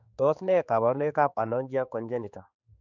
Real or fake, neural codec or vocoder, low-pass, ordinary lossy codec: fake; codec, 16 kHz, 4 kbps, X-Codec, HuBERT features, trained on general audio; 7.2 kHz; none